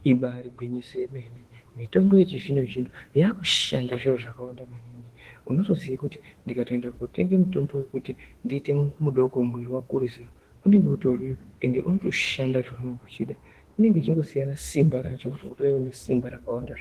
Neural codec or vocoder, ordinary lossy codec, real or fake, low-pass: autoencoder, 48 kHz, 32 numbers a frame, DAC-VAE, trained on Japanese speech; Opus, 16 kbps; fake; 14.4 kHz